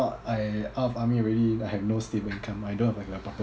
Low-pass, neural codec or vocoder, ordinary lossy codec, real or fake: none; none; none; real